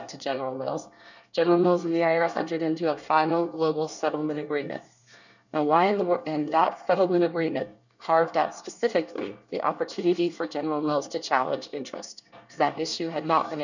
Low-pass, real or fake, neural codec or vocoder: 7.2 kHz; fake; codec, 24 kHz, 1 kbps, SNAC